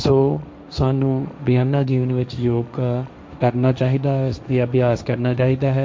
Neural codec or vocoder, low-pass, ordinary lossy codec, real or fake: codec, 16 kHz, 1.1 kbps, Voila-Tokenizer; none; none; fake